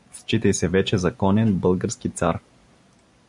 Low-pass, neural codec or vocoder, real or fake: 10.8 kHz; none; real